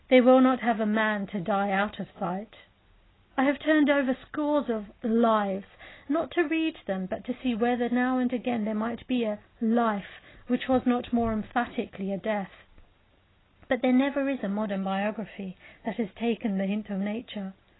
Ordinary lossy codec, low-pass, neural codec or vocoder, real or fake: AAC, 16 kbps; 7.2 kHz; none; real